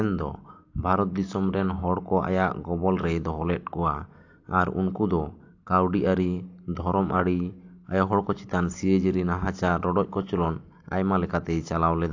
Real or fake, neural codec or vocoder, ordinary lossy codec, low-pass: real; none; AAC, 48 kbps; 7.2 kHz